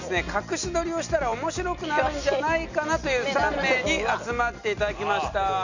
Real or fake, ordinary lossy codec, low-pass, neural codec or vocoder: real; none; 7.2 kHz; none